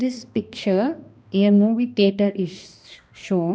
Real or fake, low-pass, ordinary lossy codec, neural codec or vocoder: fake; none; none; codec, 16 kHz, 1 kbps, X-Codec, HuBERT features, trained on balanced general audio